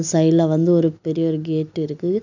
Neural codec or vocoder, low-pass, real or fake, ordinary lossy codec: none; 7.2 kHz; real; none